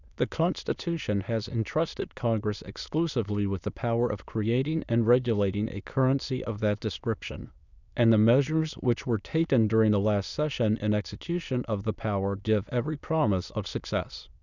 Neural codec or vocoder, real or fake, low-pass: autoencoder, 22.05 kHz, a latent of 192 numbers a frame, VITS, trained on many speakers; fake; 7.2 kHz